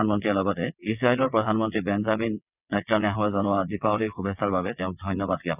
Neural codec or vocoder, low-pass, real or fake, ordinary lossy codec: vocoder, 22.05 kHz, 80 mel bands, WaveNeXt; 3.6 kHz; fake; none